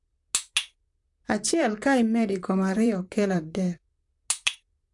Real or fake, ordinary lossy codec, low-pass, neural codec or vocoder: fake; AAC, 64 kbps; 10.8 kHz; vocoder, 44.1 kHz, 128 mel bands, Pupu-Vocoder